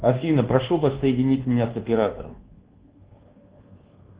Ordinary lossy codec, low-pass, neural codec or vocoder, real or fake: Opus, 16 kbps; 3.6 kHz; codec, 24 kHz, 1.2 kbps, DualCodec; fake